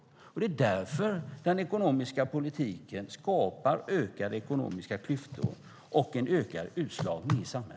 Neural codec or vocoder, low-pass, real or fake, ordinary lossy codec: none; none; real; none